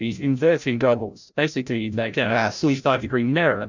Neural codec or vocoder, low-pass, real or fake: codec, 16 kHz, 0.5 kbps, FreqCodec, larger model; 7.2 kHz; fake